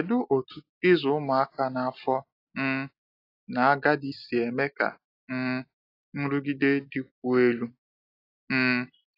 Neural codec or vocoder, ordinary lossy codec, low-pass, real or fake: none; AAC, 32 kbps; 5.4 kHz; real